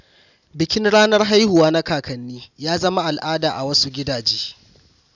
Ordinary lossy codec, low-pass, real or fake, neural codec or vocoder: none; 7.2 kHz; real; none